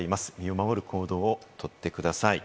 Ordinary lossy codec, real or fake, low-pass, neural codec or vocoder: none; real; none; none